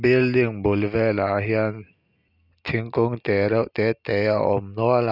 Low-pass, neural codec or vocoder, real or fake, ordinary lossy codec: 5.4 kHz; none; real; none